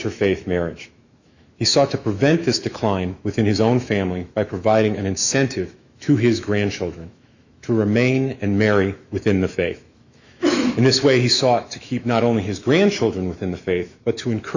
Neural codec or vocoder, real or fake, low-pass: autoencoder, 48 kHz, 128 numbers a frame, DAC-VAE, trained on Japanese speech; fake; 7.2 kHz